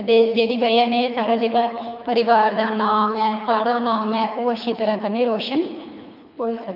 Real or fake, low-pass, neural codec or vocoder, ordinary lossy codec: fake; 5.4 kHz; codec, 24 kHz, 3 kbps, HILCodec; none